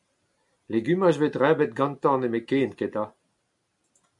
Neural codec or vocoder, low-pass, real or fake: none; 10.8 kHz; real